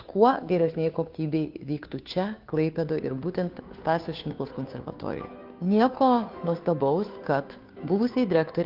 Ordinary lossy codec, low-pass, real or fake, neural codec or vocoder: Opus, 32 kbps; 5.4 kHz; fake; codec, 16 kHz, 2 kbps, FunCodec, trained on Chinese and English, 25 frames a second